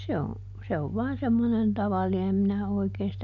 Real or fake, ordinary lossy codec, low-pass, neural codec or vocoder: real; none; 7.2 kHz; none